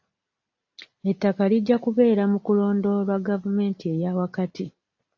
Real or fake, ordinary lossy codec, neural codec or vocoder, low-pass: real; AAC, 48 kbps; none; 7.2 kHz